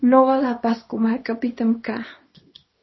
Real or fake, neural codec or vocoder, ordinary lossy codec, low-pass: fake; codec, 24 kHz, 0.9 kbps, WavTokenizer, small release; MP3, 24 kbps; 7.2 kHz